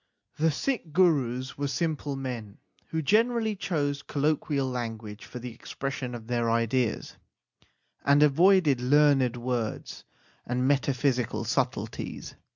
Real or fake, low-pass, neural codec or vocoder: real; 7.2 kHz; none